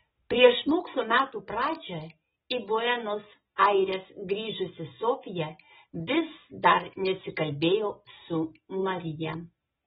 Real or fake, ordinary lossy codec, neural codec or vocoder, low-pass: real; AAC, 16 kbps; none; 19.8 kHz